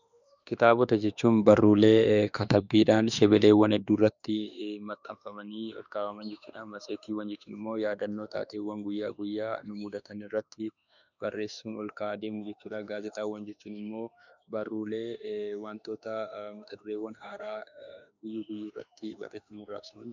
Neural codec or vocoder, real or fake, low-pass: autoencoder, 48 kHz, 32 numbers a frame, DAC-VAE, trained on Japanese speech; fake; 7.2 kHz